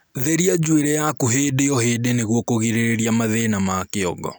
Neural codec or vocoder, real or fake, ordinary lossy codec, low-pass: none; real; none; none